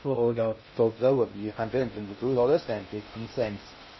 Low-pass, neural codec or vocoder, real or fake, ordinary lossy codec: 7.2 kHz; codec, 16 kHz in and 24 kHz out, 0.6 kbps, FocalCodec, streaming, 2048 codes; fake; MP3, 24 kbps